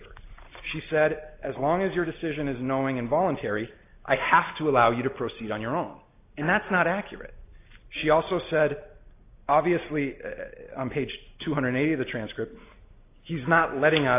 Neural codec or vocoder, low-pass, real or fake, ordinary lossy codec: none; 3.6 kHz; real; AAC, 24 kbps